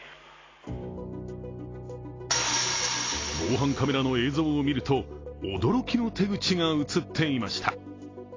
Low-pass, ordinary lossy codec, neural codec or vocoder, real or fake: 7.2 kHz; AAC, 32 kbps; none; real